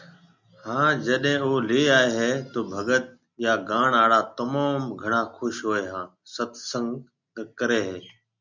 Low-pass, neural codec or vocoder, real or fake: 7.2 kHz; none; real